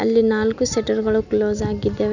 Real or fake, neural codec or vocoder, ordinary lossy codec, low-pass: real; none; none; 7.2 kHz